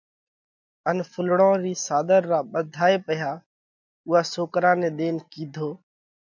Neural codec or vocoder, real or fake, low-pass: none; real; 7.2 kHz